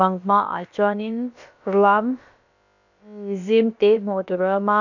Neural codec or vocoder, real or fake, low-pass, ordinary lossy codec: codec, 16 kHz, about 1 kbps, DyCAST, with the encoder's durations; fake; 7.2 kHz; none